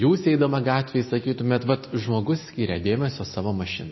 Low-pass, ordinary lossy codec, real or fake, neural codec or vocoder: 7.2 kHz; MP3, 24 kbps; real; none